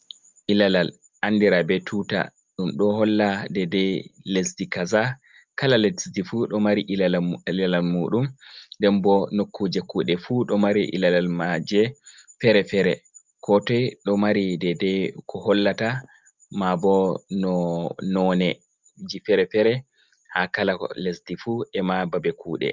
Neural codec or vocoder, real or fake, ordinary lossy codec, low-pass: none; real; Opus, 24 kbps; 7.2 kHz